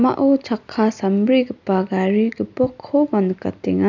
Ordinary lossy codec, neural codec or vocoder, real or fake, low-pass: none; none; real; 7.2 kHz